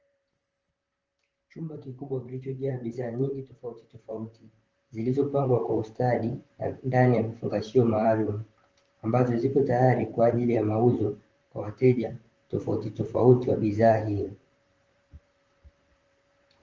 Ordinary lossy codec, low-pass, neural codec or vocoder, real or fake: Opus, 24 kbps; 7.2 kHz; vocoder, 44.1 kHz, 128 mel bands, Pupu-Vocoder; fake